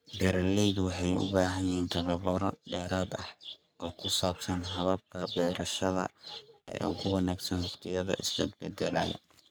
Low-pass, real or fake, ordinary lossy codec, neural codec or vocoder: none; fake; none; codec, 44.1 kHz, 3.4 kbps, Pupu-Codec